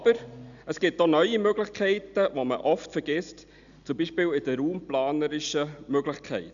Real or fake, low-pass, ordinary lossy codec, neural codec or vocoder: real; 7.2 kHz; none; none